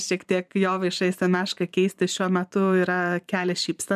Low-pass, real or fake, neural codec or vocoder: 14.4 kHz; fake; vocoder, 44.1 kHz, 128 mel bands every 512 samples, BigVGAN v2